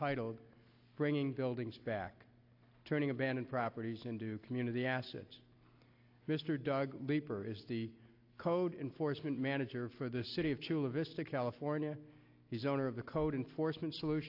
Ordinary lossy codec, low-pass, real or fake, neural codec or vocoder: AAC, 32 kbps; 5.4 kHz; real; none